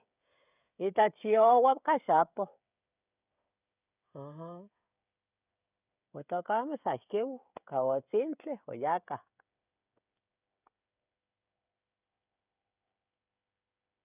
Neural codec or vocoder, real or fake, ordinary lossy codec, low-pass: codec, 16 kHz, 16 kbps, FreqCodec, smaller model; fake; none; 3.6 kHz